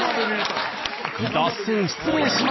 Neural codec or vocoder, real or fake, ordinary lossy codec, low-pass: none; real; MP3, 24 kbps; 7.2 kHz